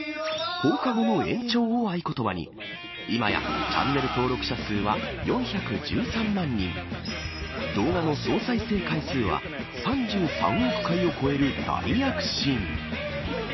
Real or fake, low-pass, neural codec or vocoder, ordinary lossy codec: real; 7.2 kHz; none; MP3, 24 kbps